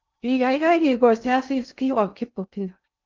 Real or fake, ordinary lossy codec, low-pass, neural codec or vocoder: fake; Opus, 24 kbps; 7.2 kHz; codec, 16 kHz in and 24 kHz out, 0.6 kbps, FocalCodec, streaming, 2048 codes